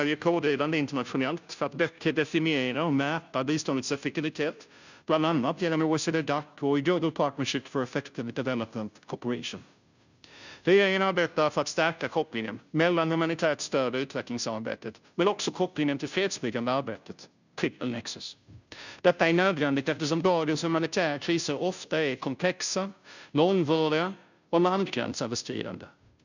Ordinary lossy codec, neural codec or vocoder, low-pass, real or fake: none; codec, 16 kHz, 0.5 kbps, FunCodec, trained on Chinese and English, 25 frames a second; 7.2 kHz; fake